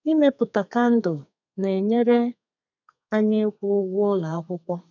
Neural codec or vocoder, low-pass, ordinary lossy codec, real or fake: codec, 32 kHz, 1.9 kbps, SNAC; 7.2 kHz; none; fake